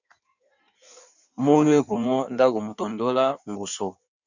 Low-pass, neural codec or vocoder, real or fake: 7.2 kHz; codec, 16 kHz in and 24 kHz out, 1.1 kbps, FireRedTTS-2 codec; fake